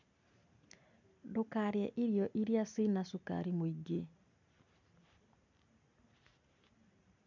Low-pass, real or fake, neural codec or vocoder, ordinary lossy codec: 7.2 kHz; real; none; none